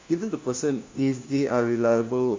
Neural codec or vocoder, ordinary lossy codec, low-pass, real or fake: codec, 16 kHz, 1 kbps, FunCodec, trained on LibriTTS, 50 frames a second; MP3, 48 kbps; 7.2 kHz; fake